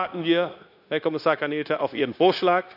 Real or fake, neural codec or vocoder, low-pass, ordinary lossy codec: fake; codec, 16 kHz, 0.9 kbps, LongCat-Audio-Codec; 5.4 kHz; none